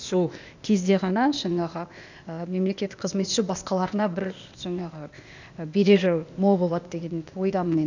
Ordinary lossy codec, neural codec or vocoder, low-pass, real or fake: none; codec, 16 kHz, 0.8 kbps, ZipCodec; 7.2 kHz; fake